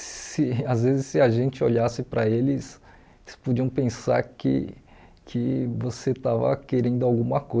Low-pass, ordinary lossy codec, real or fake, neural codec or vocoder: none; none; real; none